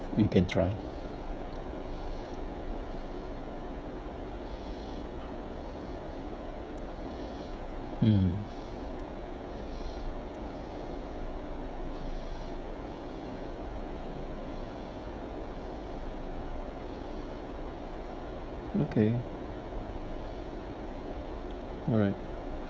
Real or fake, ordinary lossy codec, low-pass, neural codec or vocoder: fake; none; none; codec, 16 kHz, 16 kbps, FreqCodec, smaller model